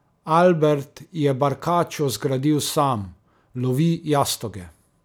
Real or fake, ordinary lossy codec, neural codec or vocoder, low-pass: real; none; none; none